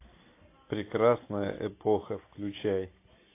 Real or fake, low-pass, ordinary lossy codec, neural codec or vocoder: real; 3.6 kHz; AAC, 24 kbps; none